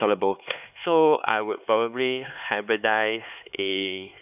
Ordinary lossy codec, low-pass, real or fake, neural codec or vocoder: none; 3.6 kHz; fake; codec, 16 kHz, 4 kbps, X-Codec, HuBERT features, trained on LibriSpeech